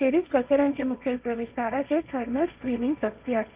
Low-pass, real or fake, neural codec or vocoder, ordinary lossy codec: 3.6 kHz; fake; codec, 16 kHz, 1.1 kbps, Voila-Tokenizer; Opus, 24 kbps